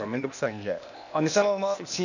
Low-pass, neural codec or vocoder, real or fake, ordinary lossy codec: 7.2 kHz; codec, 16 kHz, 0.8 kbps, ZipCodec; fake; none